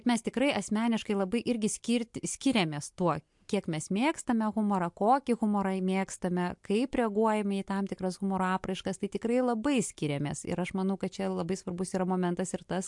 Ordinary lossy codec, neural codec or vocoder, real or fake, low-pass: MP3, 64 kbps; none; real; 10.8 kHz